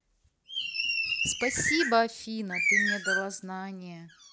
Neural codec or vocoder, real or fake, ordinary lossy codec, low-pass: none; real; none; none